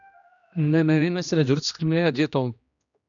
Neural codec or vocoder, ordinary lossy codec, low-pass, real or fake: codec, 16 kHz, 1 kbps, X-Codec, HuBERT features, trained on general audio; MP3, 96 kbps; 7.2 kHz; fake